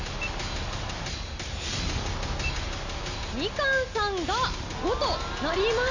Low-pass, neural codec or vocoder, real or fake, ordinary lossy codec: 7.2 kHz; none; real; Opus, 64 kbps